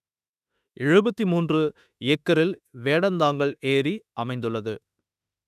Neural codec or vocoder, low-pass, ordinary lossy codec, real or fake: autoencoder, 48 kHz, 32 numbers a frame, DAC-VAE, trained on Japanese speech; 14.4 kHz; none; fake